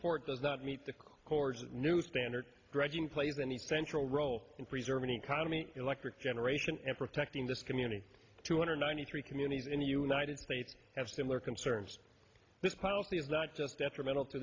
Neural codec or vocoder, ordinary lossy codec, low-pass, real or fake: none; AAC, 32 kbps; 7.2 kHz; real